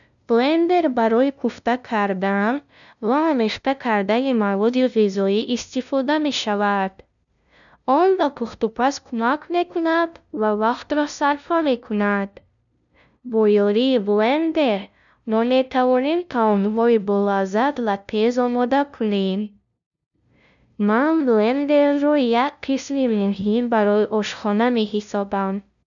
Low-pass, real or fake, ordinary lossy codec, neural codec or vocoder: 7.2 kHz; fake; none; codec, 16 kHz, 0.5 kbps, FunCodec, trained on LibriTTS, 25 frames a second